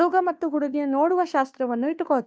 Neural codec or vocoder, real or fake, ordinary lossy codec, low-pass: codec, 16 kHz, 2 kbps, FunCodec, trained on Chinese and English, 25 frames a second; fake; none; none